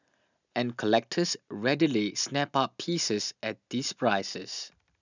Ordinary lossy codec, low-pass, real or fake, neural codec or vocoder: none; 7.2 kHz; real; none